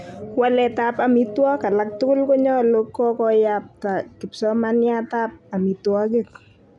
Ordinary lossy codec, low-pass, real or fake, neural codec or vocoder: none; none; real; none